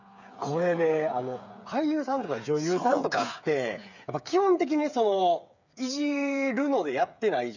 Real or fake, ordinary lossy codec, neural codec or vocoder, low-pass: fake; none; codec, 16 kHz, 8 kbps, FreqCodec, smaller model; 7.2 kHz